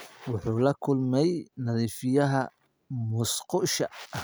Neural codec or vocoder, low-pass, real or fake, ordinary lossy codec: none; none; real; none